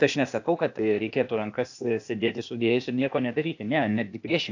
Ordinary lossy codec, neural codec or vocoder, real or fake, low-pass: AAC, 48 kbps; codec, 16 kHz, 0.8 kbps, ZipCodec; fake; 7.2 kHz